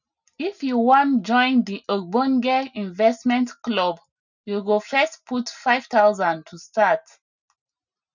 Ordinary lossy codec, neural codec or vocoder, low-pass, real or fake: none; none; 7.2 kHz; real